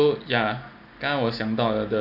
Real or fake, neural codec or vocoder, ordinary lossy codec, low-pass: real; none; none; 5.4 kHz